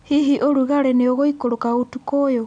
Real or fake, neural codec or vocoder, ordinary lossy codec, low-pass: real; none; none; 9.9 kHz